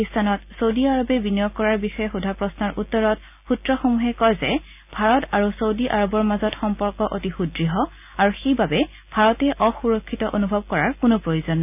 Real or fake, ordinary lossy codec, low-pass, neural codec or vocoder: real; none; 3.6 kHz; none